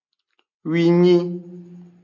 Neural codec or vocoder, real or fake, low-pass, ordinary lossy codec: none; real; 7.2 kHz; MP3, 48 kbps